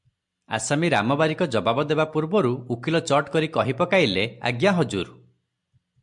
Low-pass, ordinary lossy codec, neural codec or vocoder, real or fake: 10.8 kHz; MP3, 64 kbps; none; real